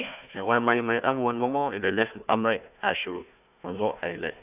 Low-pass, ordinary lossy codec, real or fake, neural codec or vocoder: 3.6 kHz; none; fake; codec, 16 kHz, 1 kbps, FunCodec, trained on Chinese and English, 50 frames a second